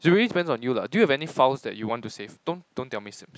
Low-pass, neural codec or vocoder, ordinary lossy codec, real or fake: none; none; none; real